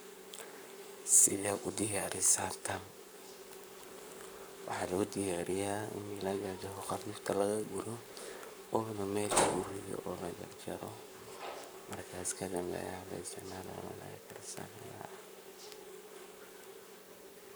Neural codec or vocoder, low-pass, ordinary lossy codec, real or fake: codec, 44.1 kHz, 7.8 kbps, Pupu-Codec; none; none; fake